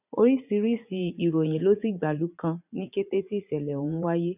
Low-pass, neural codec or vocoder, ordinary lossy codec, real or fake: 3.6 kHz; vocoder, 44.1 kHz, 80 mel bands, Vocos; none; fake